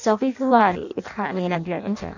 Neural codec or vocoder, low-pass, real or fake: codec, 16 kHz in and 24 kHz out, 0.6 kbps, FireRedTTS-2 codec; 7.2 kHz; fake